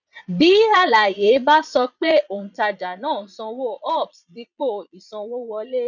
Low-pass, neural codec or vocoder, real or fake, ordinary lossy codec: 7.2 kHz; vocoder, 22.05 kHz, 80 mel bands, Vocos; fake; none